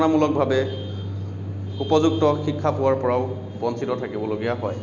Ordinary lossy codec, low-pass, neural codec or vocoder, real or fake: none; 7.2 kHz; none; real